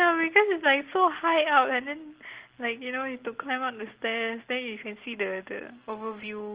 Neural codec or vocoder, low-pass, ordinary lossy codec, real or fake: none; 3.6 kHz; Opus, 16 kbps; real